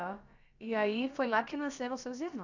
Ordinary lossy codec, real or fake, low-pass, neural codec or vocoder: none; fake; 7.2 kHz; codec, 16 kHz, about 1 kbps, DyCAST, with the encoder's durations